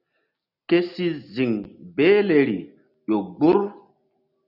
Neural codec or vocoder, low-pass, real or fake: none; 5.4 kHz; real